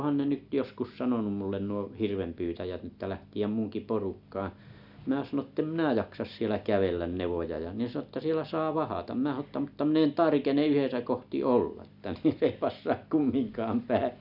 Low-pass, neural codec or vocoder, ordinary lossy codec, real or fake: 5.4 kHz; none; none; real